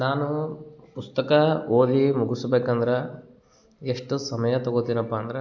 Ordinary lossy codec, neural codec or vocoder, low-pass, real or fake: none; none; 7.2 kHz; real